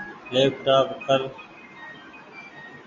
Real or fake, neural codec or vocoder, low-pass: real; none; 7.2 kHz